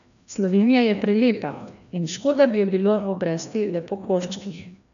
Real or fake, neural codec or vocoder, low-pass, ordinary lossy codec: fake; codec, 16 kHz, 1 kbps, FreqCodec, larger model; 7.2 kHz; none